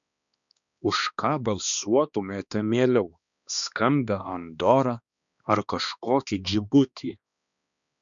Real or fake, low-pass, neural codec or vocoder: fake; 7.2 kHz; codec, 16 kHz, 2 kbps, X-Codec, HuBERT features, trained on balanced general audio